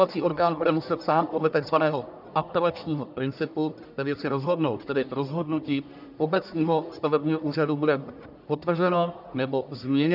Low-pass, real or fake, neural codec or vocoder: 5.4 kHz; fake; codec, 44.1 kHz, 1.7 kbps, Pupu-Codec